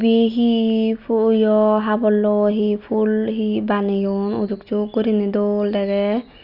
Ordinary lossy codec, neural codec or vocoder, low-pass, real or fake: Opus, 64 kbps; none; 5.4 kHz; real